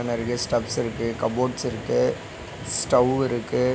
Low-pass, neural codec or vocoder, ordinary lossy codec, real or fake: none; none; none; real